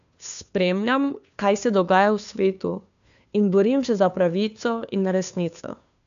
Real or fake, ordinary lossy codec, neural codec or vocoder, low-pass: fake; none; codec, 16 kHz, 2 kbps, FunCodec, trained on Chinese and English, 25 frames a second; 7.2 kHz